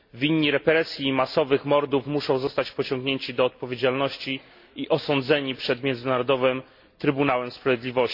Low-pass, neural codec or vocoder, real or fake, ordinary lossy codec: 5.4 kHz; none; real; MP3, 32 kbps